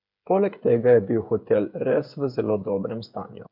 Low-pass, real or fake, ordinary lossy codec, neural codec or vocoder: 5.4 kHz; fake; none; codec, 16 kHz, 8 kbps, FreqCodec, smaller model